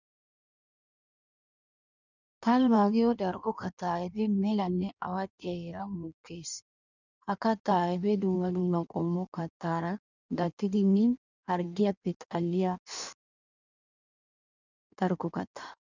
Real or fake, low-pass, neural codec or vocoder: fake; 7.2 kHz; codec, 16 kHz in and 24 kHz out, 1.1 kbps, FireRedTTS-2 codec